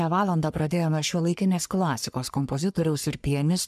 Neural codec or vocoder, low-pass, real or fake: codec, 44.1 kHz, 3.4 kbps, Pupu-Codec; 14.4 kHz; fake